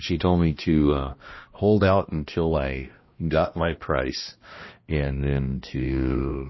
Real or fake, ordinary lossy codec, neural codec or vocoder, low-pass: fake; MP3, 24 kbps; codec, 16 kHz, 1 kbps, X-Codec, HuBERT features, trained on balanced general audio; 7.2 kHz